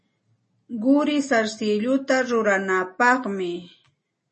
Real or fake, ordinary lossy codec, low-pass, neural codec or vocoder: real; MP3, 32 kbps; 10.8 kHz; none